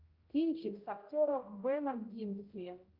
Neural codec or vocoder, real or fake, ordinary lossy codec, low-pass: codec, 16 kHz, 0.5 kbps, X-Codec, HuBERT features, trained on general audio; fake; Opus, 24 kbps; 5.4 kHz